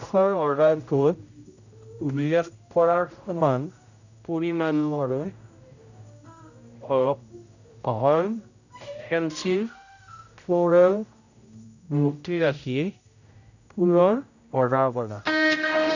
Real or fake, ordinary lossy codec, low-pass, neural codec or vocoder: fake; none; 7.2 kHz; codec, 16 kHz, 0.5 kbps, X-Codec, HuBERT features, trained on general audio